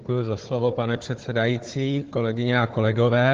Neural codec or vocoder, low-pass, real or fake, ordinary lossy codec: codec, 16 kHz, 4 kbps, FunCodec, trained on Chinese and English, 50 frames a second; 7.2 kHz; fake; Opus, 16 kbps